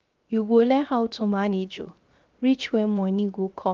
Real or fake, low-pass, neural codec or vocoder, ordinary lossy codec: fake; 7.2 kHz; codec, 16 kHz, 0.7 kbps, FocalCodec; Opus, 32 kbps